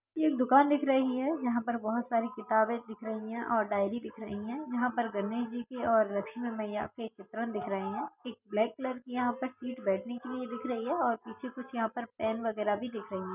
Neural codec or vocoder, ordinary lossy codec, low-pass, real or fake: none; none; 3.6 kHz; real